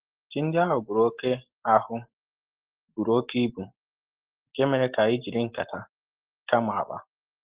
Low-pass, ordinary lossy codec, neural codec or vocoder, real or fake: 3.6 kHz; Opus, 16 kbps; none; real